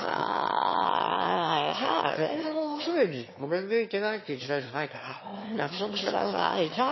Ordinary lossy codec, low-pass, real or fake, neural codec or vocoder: MP3, 24 kbps; 7.2 kHz; fake; autoencoder, 22.05 kHz, a latent of 192 numbers a frame, VITS, trained on one speaker